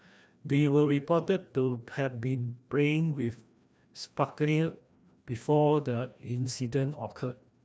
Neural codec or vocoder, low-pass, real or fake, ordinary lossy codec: codec, 16 kHz, 1 kbps, FreqCodec, larger model; none; fake; none